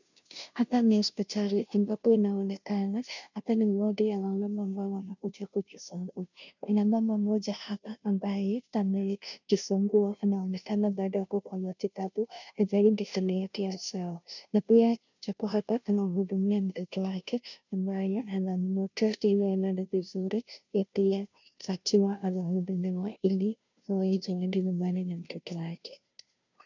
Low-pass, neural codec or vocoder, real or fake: 7.2 kHz; codec, 16 kHz, 0.5 kbps, FunCodec, trained on Chinese and English, 25 frames a second; fake